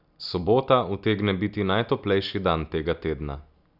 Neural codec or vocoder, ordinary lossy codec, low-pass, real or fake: none; none; 5.4 kHz; real